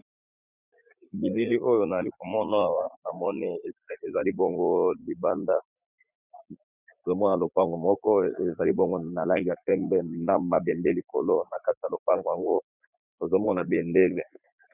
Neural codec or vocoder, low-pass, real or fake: vocoder, 44.1 kHz, 80 mel bands, Vocos; 3.6 kHz; fake